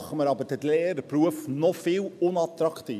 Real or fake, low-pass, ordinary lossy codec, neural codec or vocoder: real; 14.4 kHz; none; none